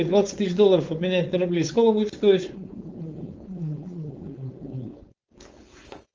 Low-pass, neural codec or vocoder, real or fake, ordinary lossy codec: 7.2 kHz; codec, 16 kHz, 4.8 kbps, FACodec; fake; Opus, 24 kbps